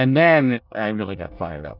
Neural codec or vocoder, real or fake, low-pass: codec, 24 kHz, 1 kbps, SNAC; fake; 5.4 kHz